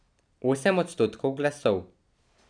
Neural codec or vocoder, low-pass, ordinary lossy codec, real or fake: none; 9.9 kHz; none; real